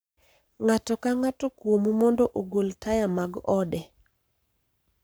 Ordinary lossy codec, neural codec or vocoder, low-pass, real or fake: none; codec, 44.1 kHz, 7.8 kbps, Pupu-Codec; none; fake